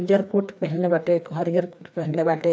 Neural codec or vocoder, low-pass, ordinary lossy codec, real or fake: codec, 16 kHz, 2 kbps, FreqCodec, larger model; none; none; fake